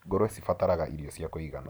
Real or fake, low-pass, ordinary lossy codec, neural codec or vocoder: fake; none; none; vocoder, 44.1 kHz, 128 mel bands every 512 samples, BigVGAN v2